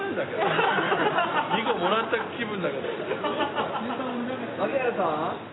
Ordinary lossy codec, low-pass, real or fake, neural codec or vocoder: AAC, 16 kbps; 7.2 kHz; real; none